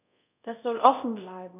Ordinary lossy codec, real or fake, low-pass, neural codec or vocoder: none; fake; 3.6 kHz; codec, 24 kHz, 0.5 kbps, DualCodec